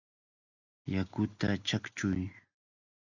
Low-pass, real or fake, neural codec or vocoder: 7.2 kHz; real; none